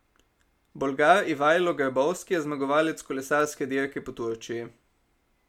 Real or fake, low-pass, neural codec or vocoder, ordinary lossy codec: real; 19.8 kHz; none; MP3, 96 kbps